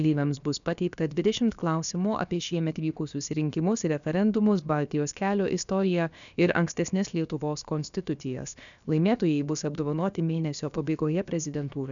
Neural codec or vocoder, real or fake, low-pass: codec, 16 kHz, about 1 kbps, DyCAST, with the encoder's durations; fake; 7.2 kHz